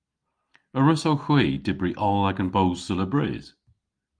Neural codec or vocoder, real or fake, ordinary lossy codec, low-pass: none; real; Opus, 32 kbps; 9.9 kHz